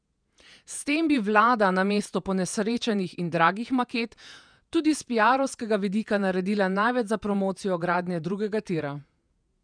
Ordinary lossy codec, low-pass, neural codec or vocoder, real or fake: none; 9.9 kHz; vocoder, 48 kHz, 128 mel bands, Vocos; fake